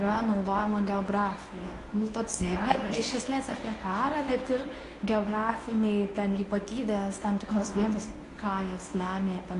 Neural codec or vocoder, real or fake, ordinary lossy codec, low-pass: codec, 24 kHz, 0.9 kbps, WavTokenizer, medium speech release version 1; fake; AAC, 48 kbps; 10.8 kHz